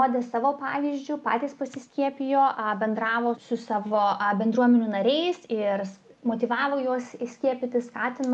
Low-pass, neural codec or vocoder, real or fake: 10.8 kHz; none; real